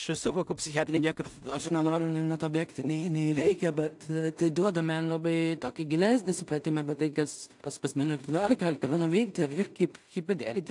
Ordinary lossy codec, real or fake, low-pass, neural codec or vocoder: AAC, 64 kbps; fake; 10.8 kHz; codec, 16 kHz in and 24 kHz out, 0.4 kbps, LongCat-Audio-Codec, two codebook decoder